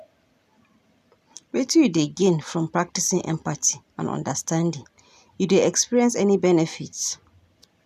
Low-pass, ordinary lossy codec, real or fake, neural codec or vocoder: 14.4 kHz; none; real; none